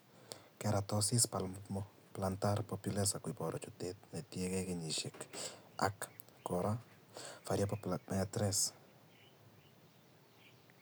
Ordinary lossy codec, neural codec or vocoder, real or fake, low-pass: none; none; real; none